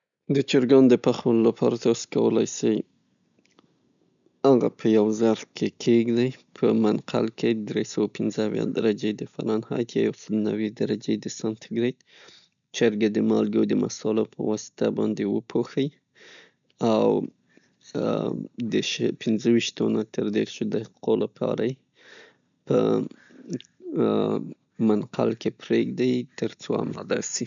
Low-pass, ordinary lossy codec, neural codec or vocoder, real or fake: 7.2 kHz; none; none; real